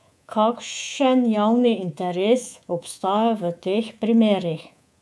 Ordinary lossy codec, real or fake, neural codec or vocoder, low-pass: none; fake; codec, 24 kHz, 3.1 kbps, DualCodec; none